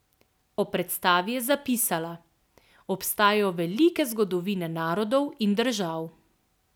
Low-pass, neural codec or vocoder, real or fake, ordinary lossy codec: none; none; real; none